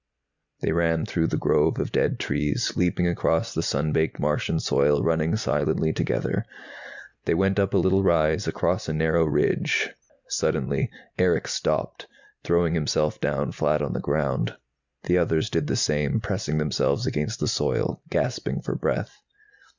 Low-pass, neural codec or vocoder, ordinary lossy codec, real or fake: 7.2 kHz; none; Opus, 64 kbps; real